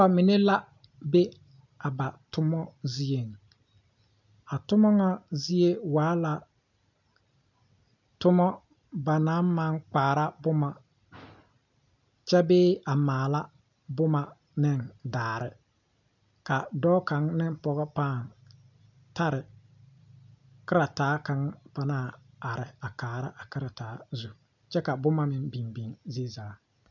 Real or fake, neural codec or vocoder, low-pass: real; none; 7.2 kHz